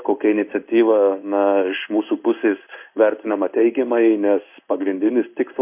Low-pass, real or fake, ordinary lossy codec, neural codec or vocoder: 3.6 kHz; fake; MP3, 32 kbps; codec, 16 kHz in and 24 kHz out, 1 kbps, XY-Tokenizer